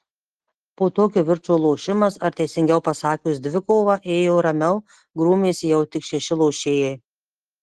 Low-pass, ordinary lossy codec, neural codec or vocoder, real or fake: 10.8 kHz; Opus, 16 kbps; none; real